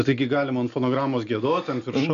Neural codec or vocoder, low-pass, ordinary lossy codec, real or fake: none; 7.2 kHz; AAC, 96 kbps; real